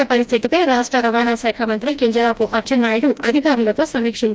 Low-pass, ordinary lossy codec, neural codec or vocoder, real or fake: none; none; codec, 16 kHz, 1 kbps, FreqCodec, smaller model; fake